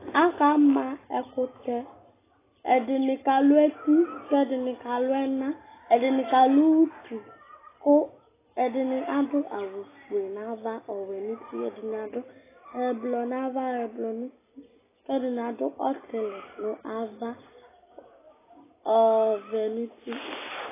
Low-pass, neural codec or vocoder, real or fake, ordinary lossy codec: 3.6 kHz; none; real; AAC, 16 kbps